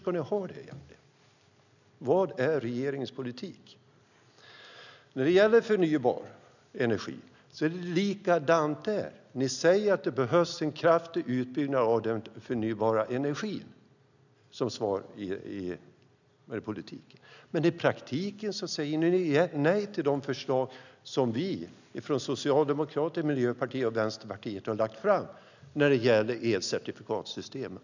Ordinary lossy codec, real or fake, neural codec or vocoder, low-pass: none; real; none; 7.2 kHz